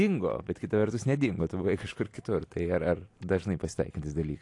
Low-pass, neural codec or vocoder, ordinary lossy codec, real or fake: 10.8 kHz; none; AAC, 48 kbps; real